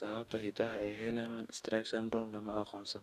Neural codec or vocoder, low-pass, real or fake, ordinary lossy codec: codec, 44.1 kHz, 2.6 kbps, DAC; 14.4 kHz; fake; none